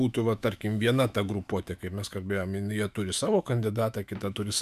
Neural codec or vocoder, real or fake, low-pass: autoencoder, 48 kHz, 128 numbers a frame, DAC-VAE, trained on Japanese speech; fake; 14.4 kHz